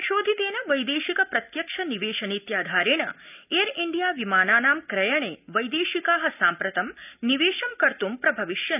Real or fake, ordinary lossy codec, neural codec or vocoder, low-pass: real; none; none; 3.6 kHz